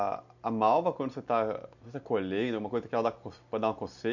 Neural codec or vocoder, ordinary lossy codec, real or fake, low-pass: none; none; real; 7.2 kHz